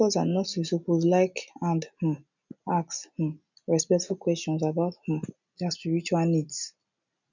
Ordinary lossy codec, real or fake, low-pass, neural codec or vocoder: none; real; 7.2 kHz; none